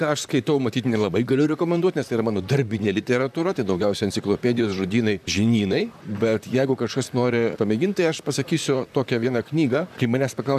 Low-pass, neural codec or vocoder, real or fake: 14.4 kHz; vocoder, 44.1 kHz, 128 mel bands, Pupu-Vocoder; fake